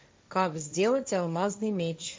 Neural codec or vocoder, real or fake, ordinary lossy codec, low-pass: codec, 16 kHz, 1.1 kbps, Voila-Tokenizer; fake; none; none